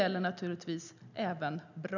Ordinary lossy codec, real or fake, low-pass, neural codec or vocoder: none; real; 7.2 kHz; none